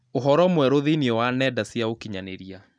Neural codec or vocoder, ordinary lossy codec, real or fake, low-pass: none; none; real; 9.9 kHz